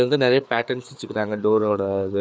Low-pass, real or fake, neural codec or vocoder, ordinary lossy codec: none; fake; codec, 16 kHz, 4 kbps, FreqCodec, larger model; none